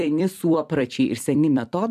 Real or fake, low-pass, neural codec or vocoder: fake; 14.4 kHz; vocoder, 44.1 kHz, 128 mel bands every 256 samples, BigVGAN v2